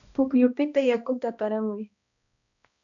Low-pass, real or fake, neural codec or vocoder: 7.2 kHz; fake; codec, 16 kHz, 1 kbps, X-Codec, HuBERT features, trained on balanced general audio